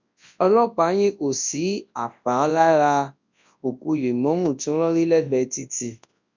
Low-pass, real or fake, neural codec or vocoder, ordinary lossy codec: 7.2 kHz; fake; codec, 24 kHz, 0.9 kbps, WavTokenizer, large speech release; MP3, 64 kbps